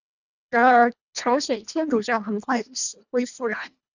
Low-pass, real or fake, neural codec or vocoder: 7.2 kHz; fake; codec, 24 kHz, 1.5 kbps, HILCodec